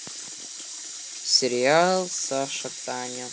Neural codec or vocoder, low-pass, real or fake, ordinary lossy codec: none; none; real; none